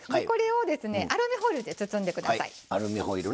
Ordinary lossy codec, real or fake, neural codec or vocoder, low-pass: none; real; none; none